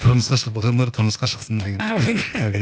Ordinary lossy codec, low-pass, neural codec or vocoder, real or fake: none; none; codec, 16 kHz, 0.8 kbps, ZipCodec; fake